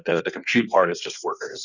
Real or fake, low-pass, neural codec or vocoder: fake; 7.2 kHz; codec, 16 kHz in and 24 kHz out, 1.1 kbps, FireRedTTS-2 codec